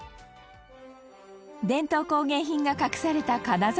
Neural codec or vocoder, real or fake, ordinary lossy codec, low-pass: none; real; none; none